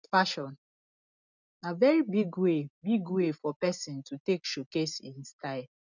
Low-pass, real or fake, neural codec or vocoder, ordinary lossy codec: 7.2 kHz; real; none; none